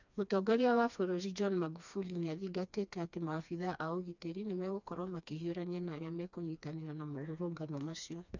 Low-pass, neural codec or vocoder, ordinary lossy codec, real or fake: 7.2 kHz; codec, 16 kHz, 2 kbps, FreqCodec, smaller model; none; fake